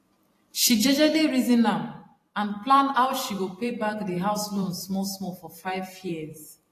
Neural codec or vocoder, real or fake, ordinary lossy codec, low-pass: vocoder, 44.1 kHz, 128 mel bands every 512 samples, BigVGAN v2; fake; AAC, 48 kbps; 14.4 kHz